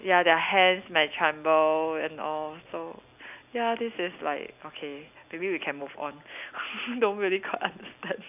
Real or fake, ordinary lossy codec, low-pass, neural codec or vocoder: real; none; 3.6 kHz; none